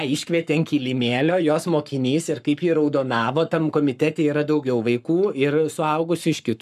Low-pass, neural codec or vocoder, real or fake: 14.4 kHz; codec, 44.1 kHz, 7.8 kbps, Pupu-Codec; fake